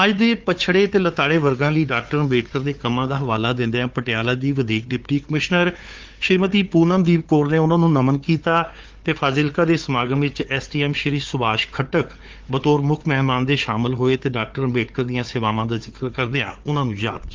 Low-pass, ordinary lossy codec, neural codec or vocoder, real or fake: 7.2 kHz; Opus, 24 kbps; codec, 16 kHz, 4 kbps, FunCodec, trained on Chinese and English, 50 frames a second; fake